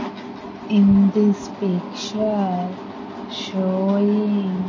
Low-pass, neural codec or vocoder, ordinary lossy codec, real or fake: 7.2 kHz; none; MP3, 32 kbps; real